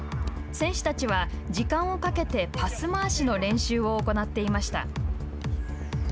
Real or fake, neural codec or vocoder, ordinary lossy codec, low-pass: real; none; none; none